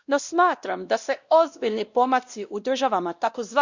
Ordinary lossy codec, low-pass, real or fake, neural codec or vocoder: Opus, 64 kbps; 7.2 kHz; fake; codec, 16 kHz, 1 kbps, X-Codec, WavLM features, trained on Multilingual LibriSpeech